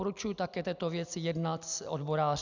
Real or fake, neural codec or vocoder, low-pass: real; none; 7.2 kHz